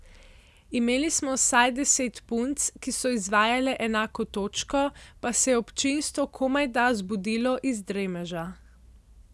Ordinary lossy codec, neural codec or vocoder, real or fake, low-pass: none; none; real; none